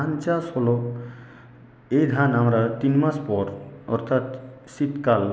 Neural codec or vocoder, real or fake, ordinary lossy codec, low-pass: none; real; none; none